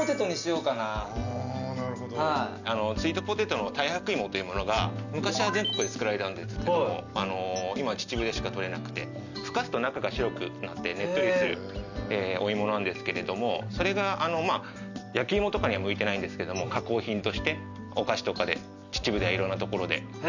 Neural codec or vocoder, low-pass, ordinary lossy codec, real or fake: none; 7.2 kHz; none; real